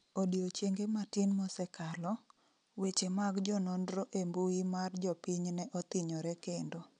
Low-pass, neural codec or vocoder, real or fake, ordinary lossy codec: 9.9 kHz; none; real; none